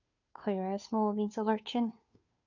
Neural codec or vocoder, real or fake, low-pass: codec, 16 kHz, 2 kbps, FunCodec, trained on Chinese and English, 25 frames a second; fake; 7.2 kHz